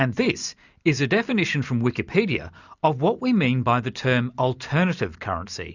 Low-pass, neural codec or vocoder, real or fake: 7.2 kHz; none; real